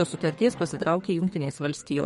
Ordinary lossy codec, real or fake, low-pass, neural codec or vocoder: MP3, 48 kbps; fake; 14.4 kHz; codec, 32 kHz, 1.9 kbps, SNAC